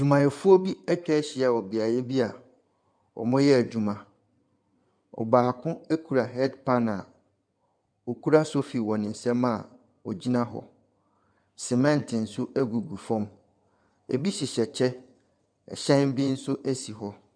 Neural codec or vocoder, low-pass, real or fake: codec, 16 kHz in and 24 kHz out, 2.2 kbps, FireRedTTS-2 codec; 9.9 kHz; fake